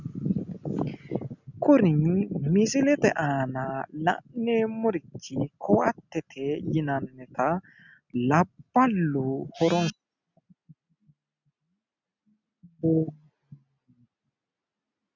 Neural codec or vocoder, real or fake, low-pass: none; real; 7.2 kHz